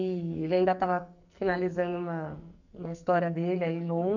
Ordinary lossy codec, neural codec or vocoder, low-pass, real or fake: none; codec, 44.1 kHz, 2.6 kbps, SNAC; 7.2 kHz; fake